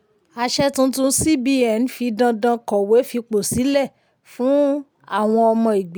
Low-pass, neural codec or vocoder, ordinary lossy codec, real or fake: none; none; none; real